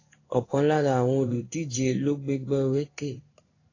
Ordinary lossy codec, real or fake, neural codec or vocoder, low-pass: AAC, 32 kbps; fake; codec, 16 kHz in and 24 kHz out, 1 kbps, XY-Tokenizer; 7.2 kHz